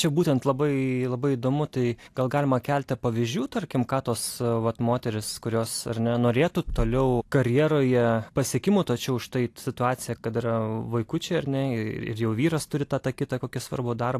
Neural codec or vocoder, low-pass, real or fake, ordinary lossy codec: none; 14.4 kHz; real; AAC, 64 kbps